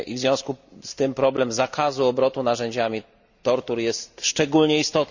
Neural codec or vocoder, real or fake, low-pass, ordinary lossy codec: none; real; 7.2 kHz; none